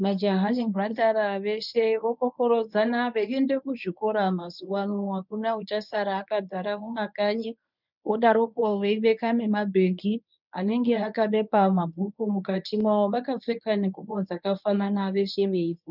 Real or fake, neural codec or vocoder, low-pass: fake; codec, 24 kHz, 0.9 kbps, WavTokenizer, medium speech release version 1; 5.4 kHz